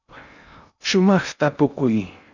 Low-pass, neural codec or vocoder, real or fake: 7.2 kHz; codec, 16 kHz in and 24 kHz out, 0.6 kbps, FocalCodec, streaming, 2048 codes; fake